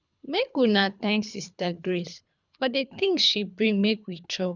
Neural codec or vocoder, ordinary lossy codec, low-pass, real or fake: codec, 24 kHz, 3 kbps, HILCodec; none; 7.2 kHz; fake